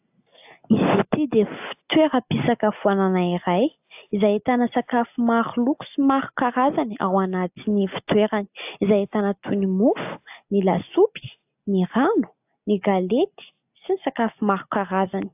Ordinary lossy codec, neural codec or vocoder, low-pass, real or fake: AAC, 32 kbps; none; 3.6 kHz; real